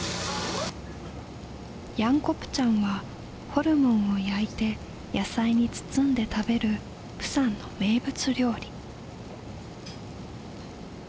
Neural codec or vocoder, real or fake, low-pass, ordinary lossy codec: none; real; none; none